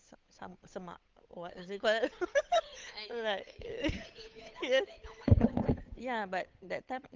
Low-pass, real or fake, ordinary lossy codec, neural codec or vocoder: 7.2 kHz; fake; Opus, 24 kbps; codec, 16 kHz, 8 kbps, FunCodec, trained on Chinese and English, 25 frames a second